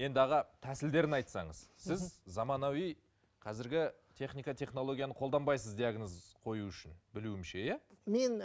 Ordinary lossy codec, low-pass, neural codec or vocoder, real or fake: none; none; none; real